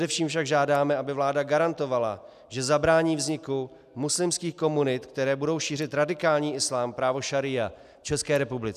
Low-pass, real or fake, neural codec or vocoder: 14.4 kHz; real; none